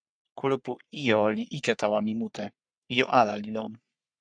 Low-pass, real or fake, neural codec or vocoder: 9.9 kHz; fake; codec, 44.1 kHz, 3.4 kbps, Pupu-Codec